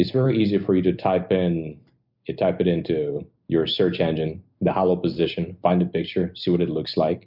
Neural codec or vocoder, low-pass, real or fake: none; 5.4 kHz; real